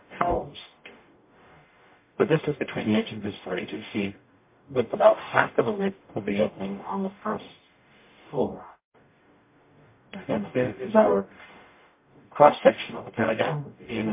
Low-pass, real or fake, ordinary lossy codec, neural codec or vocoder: 3.6 kHz; fake; MP3, 24 kbps; codec, 44.1 kHz, 0.9 kbps, DAC